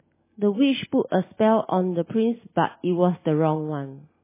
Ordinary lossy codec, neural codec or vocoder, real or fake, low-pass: MP3, 16 kbps; none; real; 3.6 kHz